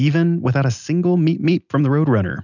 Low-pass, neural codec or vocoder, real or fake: 7.2 kHz; none; real